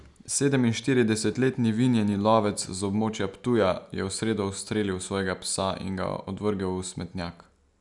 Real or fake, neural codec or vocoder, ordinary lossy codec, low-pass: real; none; none; 10.8 kHz